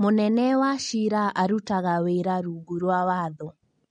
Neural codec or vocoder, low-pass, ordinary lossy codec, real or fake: none; 9.9 kHz; MP3, 48 kbps; real